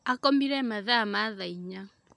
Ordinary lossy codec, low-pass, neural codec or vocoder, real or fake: none; 10.8 kHz; none; real